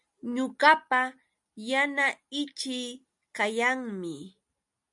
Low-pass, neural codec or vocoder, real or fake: 10.8 kHz; none; real